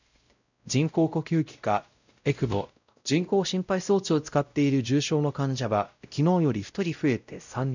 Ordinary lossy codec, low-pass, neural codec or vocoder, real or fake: none; 7.2 kHz; codec, 16 kHz, 0.5 kbps, X-Codec, WavLM features, trained on Multilingual LibriSpeech; fake